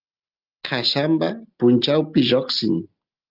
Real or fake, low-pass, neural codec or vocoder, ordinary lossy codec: real; 5.4 kHz; none; Opus, 32 kbps